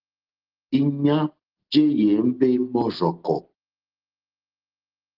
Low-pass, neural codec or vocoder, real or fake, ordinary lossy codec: 5.4 kHz; none; real; Opus, 16 kbps